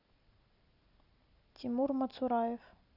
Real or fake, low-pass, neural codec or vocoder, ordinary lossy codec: real; 5.4 kHz; none; none